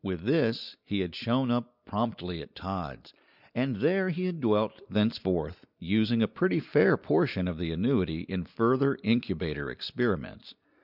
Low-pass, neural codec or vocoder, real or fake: 5.4 kHz; none; real